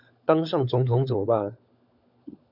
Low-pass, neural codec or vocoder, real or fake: 5.4 kHz; codec, 16 kHz, 16 kbps, FunCodec, trained on LibriTTS, 50 frames a second; fake